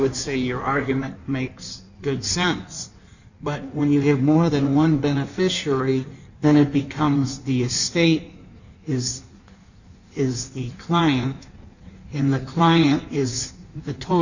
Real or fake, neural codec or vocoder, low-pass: fake; codec, 16 kHz in and 24 kHz out, 1.1 kbps, FireRedTTS-2 codec; 7.2 kHz